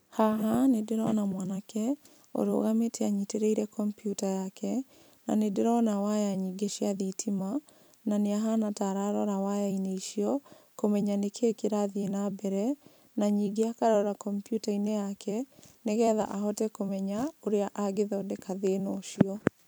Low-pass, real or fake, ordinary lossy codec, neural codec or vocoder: none; fake; none; vocoder, 44.1 kHz, 128 mel bands every 256 samples, BigVGAN v2